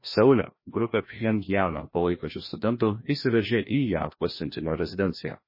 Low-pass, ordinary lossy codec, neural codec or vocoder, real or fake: 5.4 kHz; MP3, 24 kbps; codec, 16 kHz, 1 kbps, FreqCodec, larger model; fake